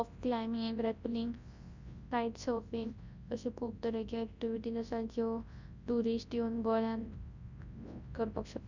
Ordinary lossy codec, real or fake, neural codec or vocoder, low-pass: Opus, 64 kbps; fake; codec, 24 kHz, 0.9 kbps, WavTokenizer, large speech release; 7.2 kHz